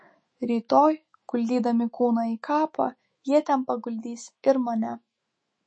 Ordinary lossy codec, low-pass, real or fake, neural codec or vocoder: MP3, 32 kbps; 9.9 kHz; fake; autoencoder, 48 kHz, 128 numbers a frame, DAC-VAE, trained on Japanese speech